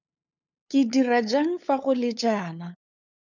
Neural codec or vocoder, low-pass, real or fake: codec, 16 kHz, 8 kbps, FunCodec, trained on LibriTTS, 25 frames a second; 7.2 kHz; fake